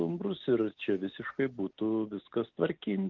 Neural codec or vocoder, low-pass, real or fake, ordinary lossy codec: none; 7.2 kHz; real; Opus, 32 kbps